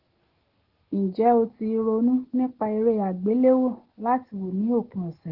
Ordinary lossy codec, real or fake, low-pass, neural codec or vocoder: Opus, 16 kbps; real; 5.4 kHz; none